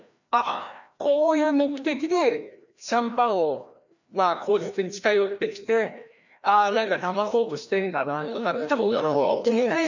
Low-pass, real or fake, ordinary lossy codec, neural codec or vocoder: 7.2 kHz; fake; none; codec, 16 kHz, 1 kbps, FreqCodec, larger model